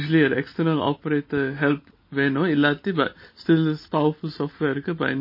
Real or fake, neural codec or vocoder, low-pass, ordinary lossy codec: real; none; 5.4 kHz; MP3, 24 kbps